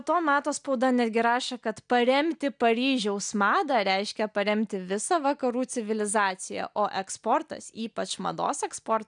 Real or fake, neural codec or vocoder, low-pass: real; none; 9.9 kHz